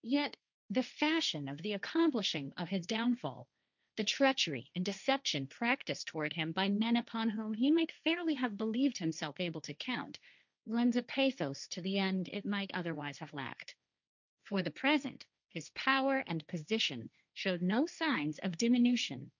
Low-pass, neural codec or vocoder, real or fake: 7.2 kHz; codec, 16 kHz, 1.1 kbps, Voila-Tokenizer; fake